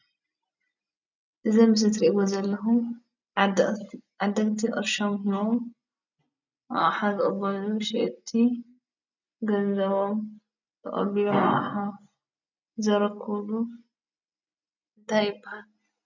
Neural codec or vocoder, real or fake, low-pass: none; real; 7.2 kHz